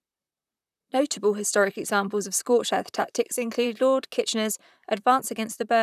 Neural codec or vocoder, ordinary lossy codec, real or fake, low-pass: vocoder, 44.1 kHz, 128 mel bands, Pupu-Vocoder; none; fake; 14.4 kHz